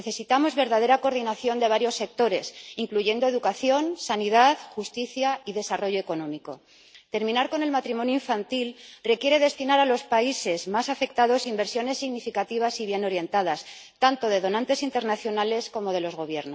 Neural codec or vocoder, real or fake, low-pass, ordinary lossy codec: none; real; none; none